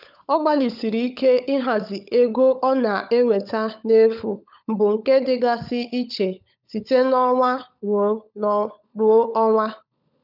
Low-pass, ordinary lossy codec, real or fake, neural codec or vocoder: 5.4 kHz; none; fake; codec, 16 kHz, 16 kbps, FunCodec, trained on LibriTTS, 50 frames a second